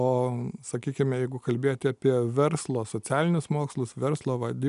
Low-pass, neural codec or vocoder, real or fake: 10.8 kHz; none; real